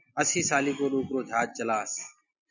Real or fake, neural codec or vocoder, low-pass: real; none; 7.2 kHz